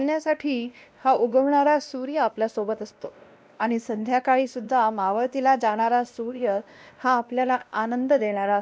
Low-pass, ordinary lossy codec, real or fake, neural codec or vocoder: none; none; fake; codec, 16 kHz, 1 kbps, X-Codec, WavLM features, trained on Multilingual LibriSpeech